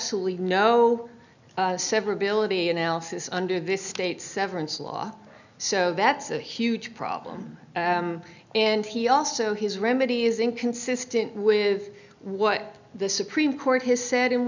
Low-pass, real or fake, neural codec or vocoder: 7.2 kHz; real; none